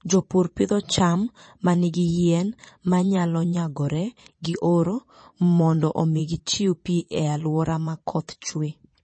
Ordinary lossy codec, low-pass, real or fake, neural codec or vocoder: MP3, 32 kbps; 9.9 kHz; fake; vocoder, 22.05 kHz, 80 mel bands, Vocos